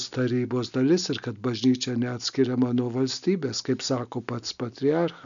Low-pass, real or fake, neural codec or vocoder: 7.2 kHz; real; none